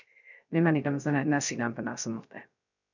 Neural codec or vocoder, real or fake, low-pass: codec, 16 kHz, 0.3 kbps, FocalCodec; fake; 7.2 kHz